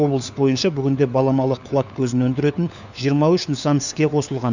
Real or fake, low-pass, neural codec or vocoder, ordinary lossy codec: fake; 7.2 kHz; codec, 16 kHz, 4 kbps, FunCodec, trained on LibriTTS, 50 frames a second; none